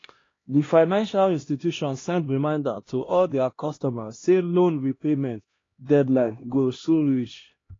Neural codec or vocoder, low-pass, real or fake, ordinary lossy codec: codec, 16 kHz, 1 kbps, X-Codec, HuBERT features, trained on LibriSpeech; 7.2 kHz; fake; AAC, 32 kbps